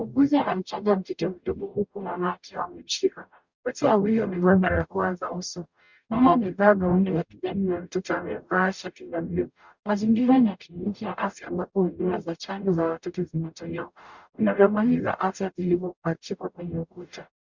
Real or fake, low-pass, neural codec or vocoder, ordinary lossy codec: fake; 7.2 kHz; codec, 44.1 kHz, 0.9 kbps, DAC; Opus, 64 kbps